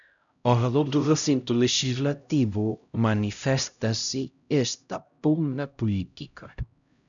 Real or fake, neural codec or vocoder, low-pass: fake; codec, 16 kHz, 0.5 kbps, X-Codec, HuBERT features, trained on LibriSpeech; 7.2 kHz